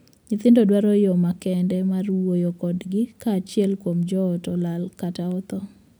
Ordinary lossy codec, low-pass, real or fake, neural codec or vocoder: none; none; real; none